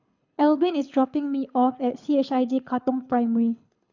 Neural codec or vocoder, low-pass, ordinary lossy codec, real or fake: codec, 24 kHz, 6 kbps, HILCodec; 7.2 kHz; none; fake